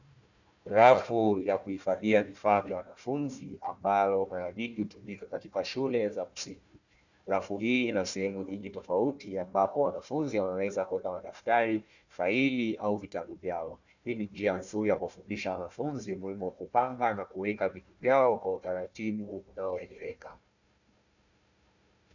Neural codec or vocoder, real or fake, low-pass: codec, 16 kHz, 1 kbps, FunCodec, trained on Chinese and English, 50 frames a second; fake; 7.2 kHz